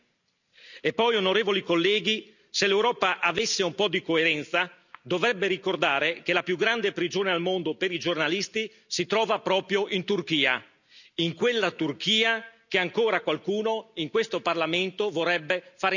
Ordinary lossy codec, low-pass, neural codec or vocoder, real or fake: none; 7.2 kHz; none; real